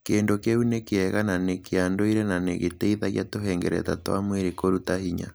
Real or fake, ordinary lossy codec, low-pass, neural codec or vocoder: real; none; none; none